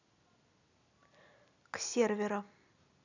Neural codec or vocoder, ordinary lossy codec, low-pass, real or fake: none; none; 7.2 kHz; real